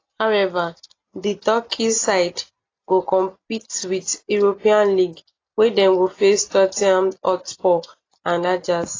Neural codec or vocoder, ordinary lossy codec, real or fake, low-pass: none; AAC, 32 kbps; real; 7.2 kHz